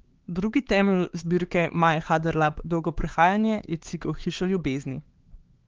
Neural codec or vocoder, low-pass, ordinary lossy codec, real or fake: codec, 16 kHz, 4 kbps, X-Codec, HuBERT features, trained on LibriSpeech; 7.2 kHz; Opus, 16 kbps; fake